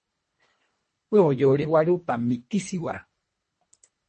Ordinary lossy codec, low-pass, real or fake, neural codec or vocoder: MP3, 32 kbps; 10.8 kHz; fake; codec, 24 kHz, 1.5 kbps, HILCodec